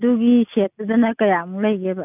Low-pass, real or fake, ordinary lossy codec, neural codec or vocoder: 3.6 kHz; real; none; none